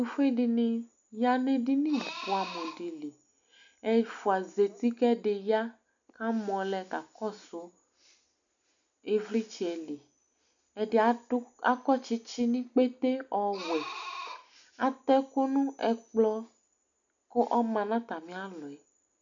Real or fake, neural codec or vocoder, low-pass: real; none; 7.2 kHz